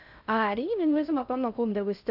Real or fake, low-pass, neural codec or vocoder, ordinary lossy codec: fake; 5.4 kHz; codec, 16 kHz in and 24 kHz out, 0.6 kbps, FocalCodec, streaming, 2048 codes; none